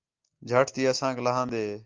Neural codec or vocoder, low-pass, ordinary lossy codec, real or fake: none; 7.2 kHz; Opus, 24 kbps; real